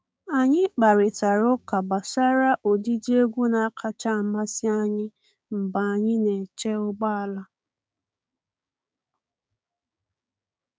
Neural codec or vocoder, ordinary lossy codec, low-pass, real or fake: codec, 16 kHz, 6 kbps, DAC; none; none; fake